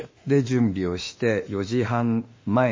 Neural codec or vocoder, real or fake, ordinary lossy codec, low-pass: autoencoder, 48 kHz, 32 numbers a frame, DAC-VAE, trained on Japanese speech; fake; MP3, 32 kbps; 7.2 kHz